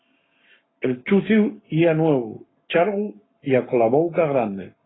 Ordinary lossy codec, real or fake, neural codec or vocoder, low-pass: AAC, 16 kbps; fake; codec, 44.1 kHz, 7.8 kbps, Pupu-Codec; 7.2 kHz